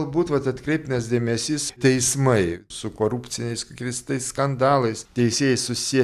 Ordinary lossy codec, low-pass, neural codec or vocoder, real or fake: AAC, 96 kbps; 14.4 kHz; none; real